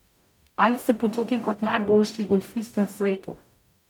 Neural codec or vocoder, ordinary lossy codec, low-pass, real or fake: codec, 44.1 kHz, 0.9 kbps, DAC; none; 19.8 kHz; fake